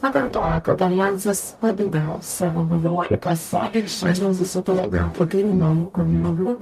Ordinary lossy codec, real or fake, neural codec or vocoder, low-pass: MP3, 64 kbps; fake; codec, 44.1 kHz, 0.9 kbps, DAC; 14.4 kHz